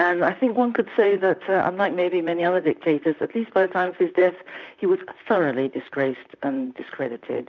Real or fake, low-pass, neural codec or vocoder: fake; 7.2 kHz; vocoder, 44.1 kHz, 128 mel bands, Pupu-Vocoder